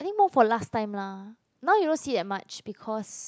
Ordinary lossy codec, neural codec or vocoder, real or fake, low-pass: none; none; real; none